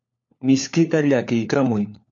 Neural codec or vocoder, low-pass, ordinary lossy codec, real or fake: codec, 16 kHz, 4 kbps, FunCodec, trained on LibriTTS, 50 frames a second; 7.2 kHz; MP3, 48 kbps; fake